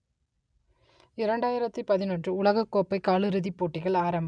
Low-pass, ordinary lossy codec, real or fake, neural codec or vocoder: 9.9 kHz; none; real; none